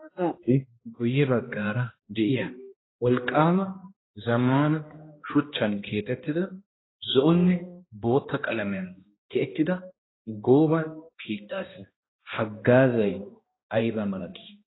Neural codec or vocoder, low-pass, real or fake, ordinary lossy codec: codec, 16 kHz, 1 kbps, X-Codec, HuBERT features, trained on balanced general audio; 7.2 kHz; fake; AAC, 16 kbps